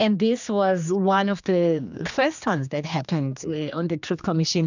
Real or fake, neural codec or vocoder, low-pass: fake; codec, 16 kHz, 2 kbps, X-Codec, HuBERT features, trained on general audio; 7.2 kHz